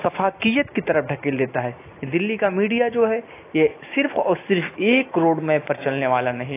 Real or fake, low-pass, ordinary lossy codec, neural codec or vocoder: real; 3.6 kHz; AAC, 24 kbps; none